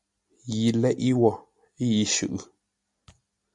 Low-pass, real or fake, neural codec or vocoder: 10.8 kHz; real; none